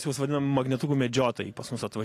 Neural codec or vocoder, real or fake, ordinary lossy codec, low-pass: none; real; AAC, 48 kbps; 14.4 kHz